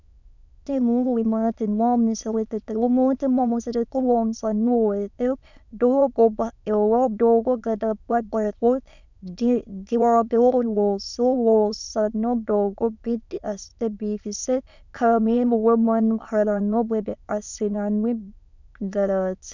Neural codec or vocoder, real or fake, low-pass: autoencoder, 22.05 kHz, a latent of 192 numbers a frame, VITS, trained on many speakers; fake; 7.2 kHz